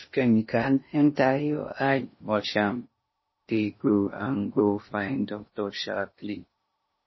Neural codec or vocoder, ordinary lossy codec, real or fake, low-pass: codec, 16 kHz in and 24 kHz out, 0.6 kbps, FocalCodec, streaming, 2048 codes; MP3, 24 kbps; fake; 7.2 kHz